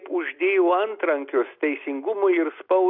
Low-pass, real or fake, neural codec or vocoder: 5.4 kHz; real; none